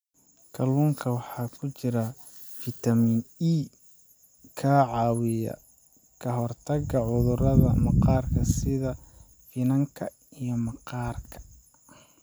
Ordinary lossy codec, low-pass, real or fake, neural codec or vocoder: none; none; real; none